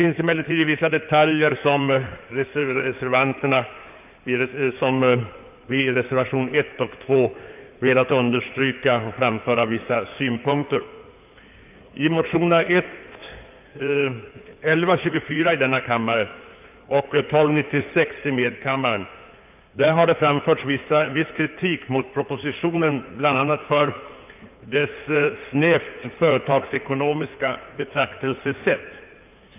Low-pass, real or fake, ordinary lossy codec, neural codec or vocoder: 3.6 kHz; fake; none; codec, 16 kHz in and 24 kHz out, 2.2 kbps, FireRedTTS-2 codec